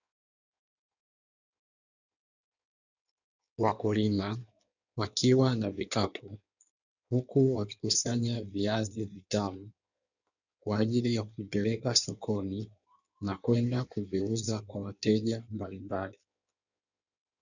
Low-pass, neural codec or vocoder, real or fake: 7.2 kHz; codec, 16 kHz in and 24 kHz out, 1.1 kbps, FireRedTTS-2 codec; fake